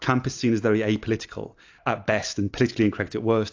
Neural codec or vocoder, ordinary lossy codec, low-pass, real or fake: none; AAC, 48 kbps; 7.2 kHz; real